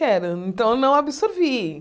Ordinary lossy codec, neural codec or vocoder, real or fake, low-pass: none; none; real; none